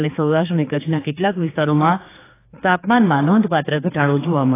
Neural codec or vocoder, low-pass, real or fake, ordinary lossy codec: codec, 16 kHz, 4 kbps, X-Codec, HuBERT features, trained on general audio; 3.6 kHz; fake; AAC, 16 kbps